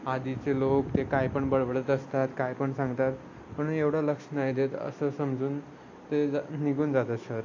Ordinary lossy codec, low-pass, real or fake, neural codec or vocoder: AAC, 48 kbps; 7.2 kHz; real; none